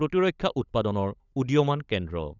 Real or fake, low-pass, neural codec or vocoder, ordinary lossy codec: fake; 7.2 kHz; codec, 16 kHz, 16 kbps, FunCodec, trained on LibriTTS, 50 frames a second; none